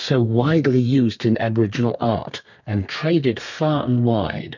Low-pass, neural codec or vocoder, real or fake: 7.2 kHz; codec, 32 kHz, 1.9 kbps, SNAC; fake